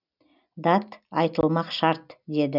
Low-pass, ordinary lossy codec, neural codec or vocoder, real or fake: 5.4 kHz; none; none; real